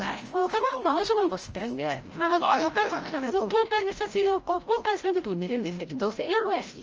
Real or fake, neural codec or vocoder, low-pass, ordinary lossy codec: fake; codec, 16 kHz, 0.5 kbps, FreqCodec, larger model; 7.2 kHz; Opus, 24 kbps